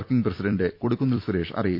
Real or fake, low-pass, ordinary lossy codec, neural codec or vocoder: fake; 5.4 kHz; MP3, 48 kbps; vocoder, 44.1 kHz, 128 mel bands every 256 samples, BigVGAN v2